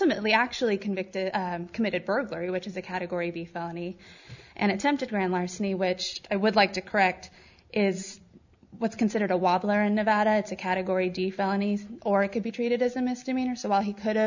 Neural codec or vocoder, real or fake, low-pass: none; real; 7.2 kHz